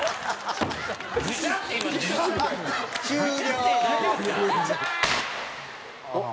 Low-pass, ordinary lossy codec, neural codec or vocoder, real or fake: none; none; none; real